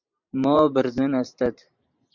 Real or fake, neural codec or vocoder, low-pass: fake; vocoder, 44.1 kHz, 128 mel bands every 512 samples, BigVGAN v2; 7.2 kHz